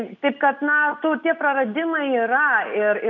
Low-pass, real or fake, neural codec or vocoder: 7.2 kHz; real; none